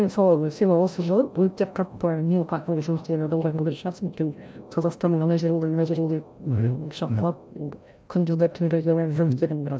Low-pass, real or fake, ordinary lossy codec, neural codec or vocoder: none; fake; none; codec, 16 kHz, 0.5 kbps, FreqCodec, larger model